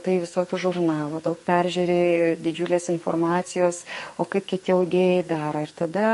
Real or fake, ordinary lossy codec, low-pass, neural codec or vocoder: fake; MP3, 48 kbps; 14.4 kHz; codec, 44.1 kHz, 2.6 kbps, SNAC